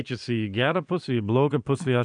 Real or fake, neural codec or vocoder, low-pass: fake; vocoder, 22.05 kHz, 80 mel bands, Vocos; 9.9 kHz